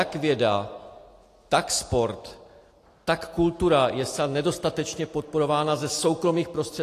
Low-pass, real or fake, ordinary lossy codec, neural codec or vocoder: 14.4 kHz; real; AAC, 48 kbps; none